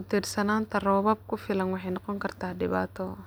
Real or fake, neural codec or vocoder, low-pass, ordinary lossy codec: real; none; none; none